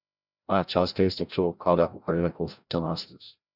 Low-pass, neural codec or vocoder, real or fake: 5.4 kHz; codec, 16 kHz, 0.5 kbps, FreqCodec, larger model; fake